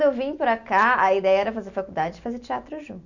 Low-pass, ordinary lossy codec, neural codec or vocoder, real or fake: 7.2 kHz; none; none; real